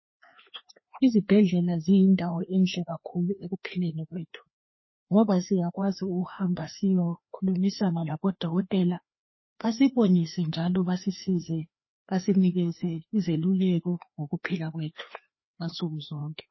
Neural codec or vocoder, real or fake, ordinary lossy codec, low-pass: codec, 16 kHz, 2 kbps, FreqCodec, larger model; fake; MP3, 24 kbps; 7.2 kHz